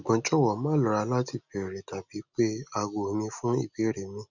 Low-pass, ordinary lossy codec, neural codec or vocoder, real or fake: 7.2 kHz; none; none; real